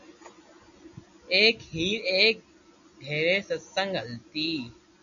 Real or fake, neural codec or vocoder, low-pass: real; none; 7.2 kHz